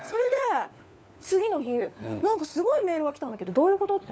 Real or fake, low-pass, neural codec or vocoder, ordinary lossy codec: fake; none; codec, 16 kHz, 4 kbps, FunCodec, trained on LibriTTS, 50 frames a second; none